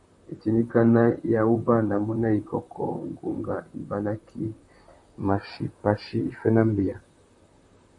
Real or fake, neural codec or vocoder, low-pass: fake; vocoder, 44.1 kHz, 128 mel bands, Pupu-Vocoder; 10.8 kHz